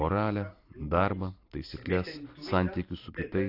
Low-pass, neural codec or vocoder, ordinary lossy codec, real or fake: 5.4 kHz; vocoder, 44.1 kHz, 128 mel bands every 512 samples, BigVGAN v2; AAC, 32 kbps; fake